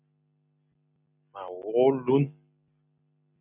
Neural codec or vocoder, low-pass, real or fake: none; 3.6 kHz; real